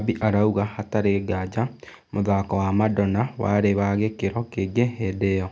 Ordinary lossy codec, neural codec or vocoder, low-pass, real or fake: none; none; none; real